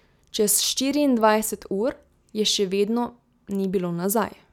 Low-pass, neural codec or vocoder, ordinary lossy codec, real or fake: 19.8 kHz; none; none; real